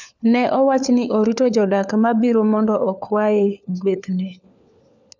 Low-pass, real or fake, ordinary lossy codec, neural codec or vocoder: 7.2 kHz; fake; none; codec, 16 kHz, 4 kbps, FunCodec, trained on LibriTTS, 50 frames a second